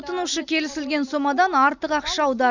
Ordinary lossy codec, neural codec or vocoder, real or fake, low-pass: none; none; real; 7.2 kHz